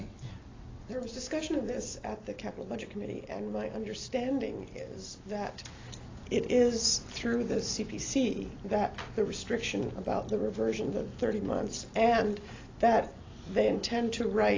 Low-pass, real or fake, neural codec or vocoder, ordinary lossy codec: 7.2 kHz; real; none; AAC, 32 kbps